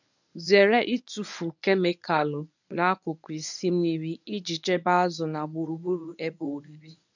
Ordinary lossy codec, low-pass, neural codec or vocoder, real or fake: none; 7.2 kHz; codec, 24 kHz, 0.9 kbps, WavTokenizer, medium speech release version 1; fake